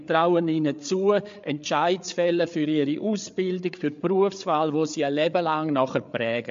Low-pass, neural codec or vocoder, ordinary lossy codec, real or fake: 7.2 kHz; codec, 16 kHz, 8 kbps, FreqCodec, larger model; MP3, 48 kbps; fake